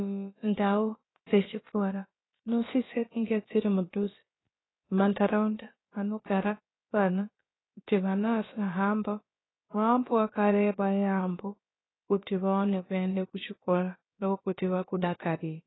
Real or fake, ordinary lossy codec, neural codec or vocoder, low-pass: fake; AAC, 16 kbps; codec, 16 kHz, about 1 kbps, DyCAST, with the encoder's durations; 7.2 kHz